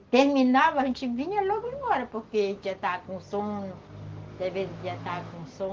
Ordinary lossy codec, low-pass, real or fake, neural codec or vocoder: Opus, 16 kbps; 7.2 kHz; real; none